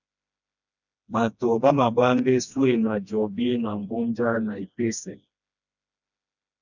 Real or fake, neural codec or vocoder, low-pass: fake; codec, 16 kHz, 1 kbps, FreqCodec, smaller model; 7.2 kHz